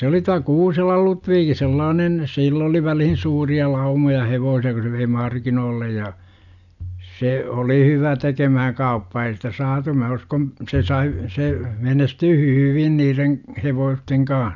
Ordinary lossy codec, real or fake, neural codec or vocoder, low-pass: none; real; none; 7.2 kHz